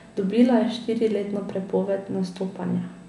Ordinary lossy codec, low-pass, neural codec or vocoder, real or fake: none; 10.8 kHz; none; real